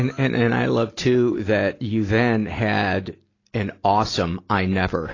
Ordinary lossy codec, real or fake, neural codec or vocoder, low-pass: AAC, 32 kbps; real; none; 7.2 kHz